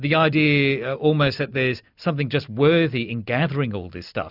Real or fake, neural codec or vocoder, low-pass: real; none; 5.4 kHz